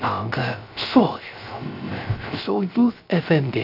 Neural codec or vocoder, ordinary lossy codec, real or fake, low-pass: codec, 16 kHz, 0.3 kbps, FocalCodec; none; fake; 5.4 kHz